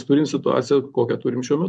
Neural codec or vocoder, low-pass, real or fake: vocoder, 24 kHz, 100 mel bands, Vocos; 10.8 kHz; fake